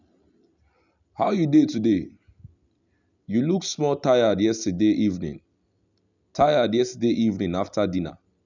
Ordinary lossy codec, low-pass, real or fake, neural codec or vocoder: none; 7.2 kHz; real; none